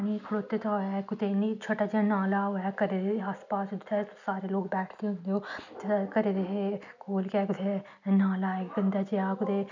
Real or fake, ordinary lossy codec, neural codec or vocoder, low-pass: real; none; none; 7.2 kHz